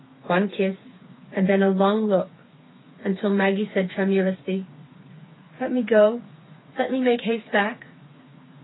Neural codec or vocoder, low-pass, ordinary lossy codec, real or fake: codec, 16 kHz, 4 kbps, FreqCodec, smaller model; 7.2 kHz; AAC, 16 kbps; fake